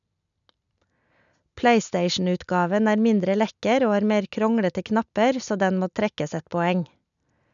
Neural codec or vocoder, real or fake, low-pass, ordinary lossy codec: none; real; 7.2 kHz; none